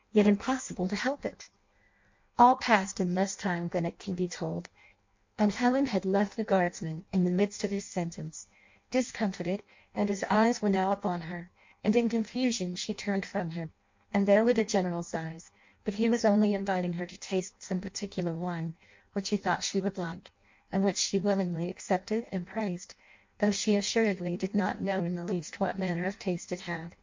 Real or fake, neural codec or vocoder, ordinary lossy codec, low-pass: fake; codec, 16 kHz in and 24 kHz out, 0.6 kbps, FireRedTTS-2 codec; MP3, 48 kbps; 7.2 kHz